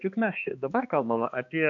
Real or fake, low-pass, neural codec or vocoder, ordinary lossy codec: fake; 7.2 kHz; codec, 16 kHz, 2 kbps, X-Codec, HuBERT features, trained on balanced general audio; AAC, 64 kbps